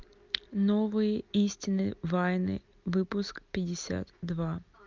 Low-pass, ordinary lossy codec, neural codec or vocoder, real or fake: 7.2 kHz; Opus, 24 kbps; none; real